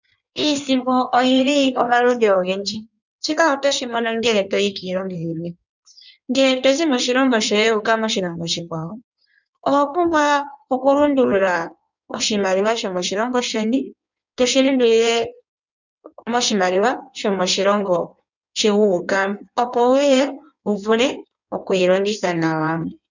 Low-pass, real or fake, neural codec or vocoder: 7.2 kHz; fake; codec, 16 kHz in and 24 kHz out, 1.1 kbps, FireRedTTS-2 codec